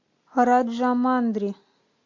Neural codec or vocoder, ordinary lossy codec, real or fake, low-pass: none; MP3, 48 kbps; real; 7.2 kHz